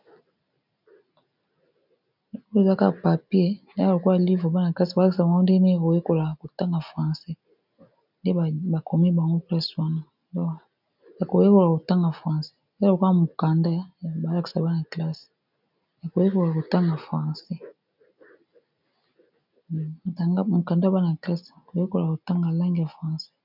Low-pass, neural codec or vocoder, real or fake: 5.4 kHz; none; real